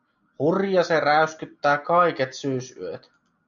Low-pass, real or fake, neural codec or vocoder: 7.2 kHz; real; none